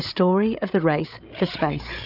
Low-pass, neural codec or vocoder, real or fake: 5.4 kHz; codec, 16 kHz, 8 kbps, FreqCodec, larger model; fake